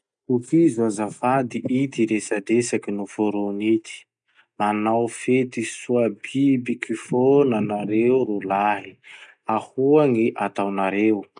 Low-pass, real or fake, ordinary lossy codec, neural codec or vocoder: 9.9 kHz; real; none; none